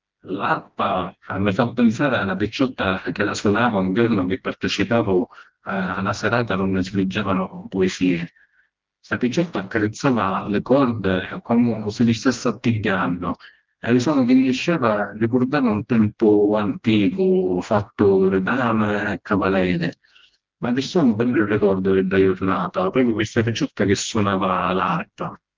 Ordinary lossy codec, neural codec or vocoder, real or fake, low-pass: Opus, 32 kbps; codec, 16 kHz, 1 kbps, FreqCodec, smaller model; fake; 7.2 kHz